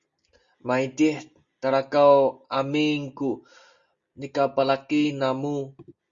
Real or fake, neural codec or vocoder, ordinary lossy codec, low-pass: real; none; Opus, 64 kbps; 7.2 kHz